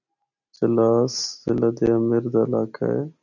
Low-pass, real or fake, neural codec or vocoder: 7.2 kHz; real; none